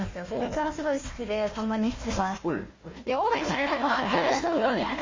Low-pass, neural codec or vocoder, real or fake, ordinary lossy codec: 7.2 kHz; codec, 16 kHz, 1 kbps, FunCodec, trained on Chinese and English, 50 frames a second; fake; MP3, 32 kbps